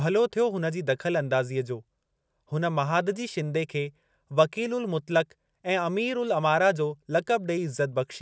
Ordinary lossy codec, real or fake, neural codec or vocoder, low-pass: none; real; none; none